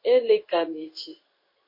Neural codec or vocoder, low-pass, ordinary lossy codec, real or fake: codec, 16 kHz in and 24 kHz out, 1 kbps, XY-Tokenizer; 5.4 kHz; MP3, 32 kbps; fake